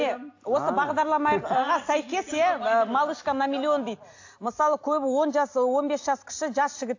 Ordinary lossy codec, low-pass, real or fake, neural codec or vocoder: AAC, 48 kbps; 7.2 kHz; real; none